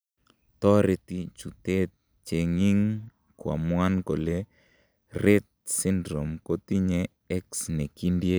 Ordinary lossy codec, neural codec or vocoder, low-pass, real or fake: none; none; none; real